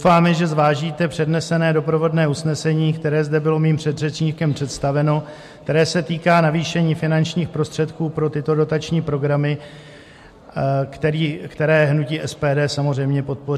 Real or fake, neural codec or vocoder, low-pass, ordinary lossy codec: real; none; 14.4 kHz; MP3, 64 kbps